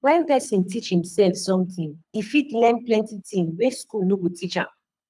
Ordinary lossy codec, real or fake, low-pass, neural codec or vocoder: none; fake; none; codec, 24 kHz, 3 kbps, HILCodec